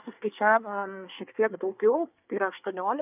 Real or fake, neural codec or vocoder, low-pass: fake; codec, 24 kHz, 1 kbps, SNAC; 3.6 kHz